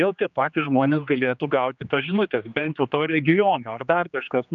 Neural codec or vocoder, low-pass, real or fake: codec, 16 kHz, 2 kbps, X-Codec, HuBERT features, trained on general audio; 7.2 kHz; fake